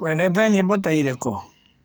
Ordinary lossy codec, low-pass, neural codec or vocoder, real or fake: none; none; codec, 44.1 kHz, 2.6 kbps, SNAC; fake